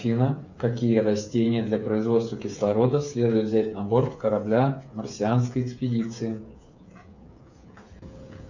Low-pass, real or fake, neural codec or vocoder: 7.2 kHz; fake; codec, 16 kHz, 8 kbps, FreqCodec, smaller model